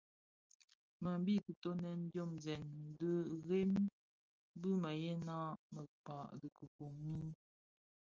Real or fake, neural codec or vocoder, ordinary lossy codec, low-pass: real; none; Opus, 24 kbps; 7.2 kHz